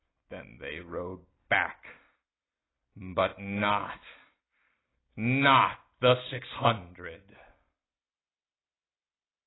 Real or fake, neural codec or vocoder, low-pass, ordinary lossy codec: real; none; 7.2 kHz; AAC, 16 kbps